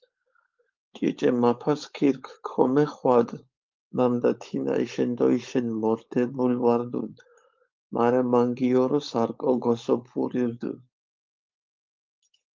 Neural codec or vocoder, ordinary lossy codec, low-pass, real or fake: codec, 16 kHz, 4.8 kbps, FACodec; Opus, 32 kbps; 7.2 kHz; fake